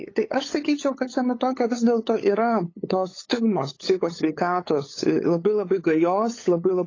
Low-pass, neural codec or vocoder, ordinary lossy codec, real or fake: 7.2 kHz; codec, 16 kHz, 8 kbps, FunCodec, trained on LibriTTS, 25 frames a second; AAC, 32 kbps; fake